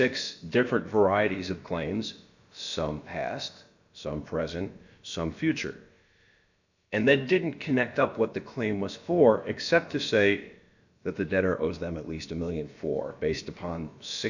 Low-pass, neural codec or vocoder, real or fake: 7.2 kHz; codec, 16 kHz, about 1 kbps, DyCAST, with the encoder's durations; fake